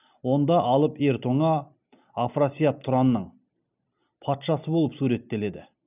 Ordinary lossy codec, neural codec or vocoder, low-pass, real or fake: none; none; 3.6 kHz; real